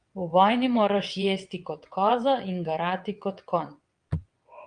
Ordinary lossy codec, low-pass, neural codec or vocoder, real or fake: Opus, 32 kbps; 9.9 kHz; vocoder, 22.05 kHz, 80 mel bands, WaveNeXt; fake